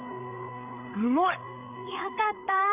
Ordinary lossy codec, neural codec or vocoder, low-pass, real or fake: none; codec, 16 kHz, 8 kbps, FreqCodec, larger model; 3.6 kHz; fake